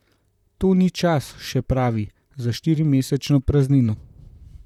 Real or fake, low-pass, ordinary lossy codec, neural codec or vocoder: fake; 19.8 kHz; none; vocoder, 44.1 kHz, 128 mel bands, Pupu-Vocoder